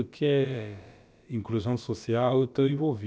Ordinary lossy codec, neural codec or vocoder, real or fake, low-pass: none; codec, 16 kHz, about 1 kbps, DyCAST, with the encoder's durations; fake; none